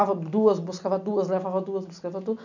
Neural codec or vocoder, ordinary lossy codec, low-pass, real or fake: none; none; 7.2 kHz; real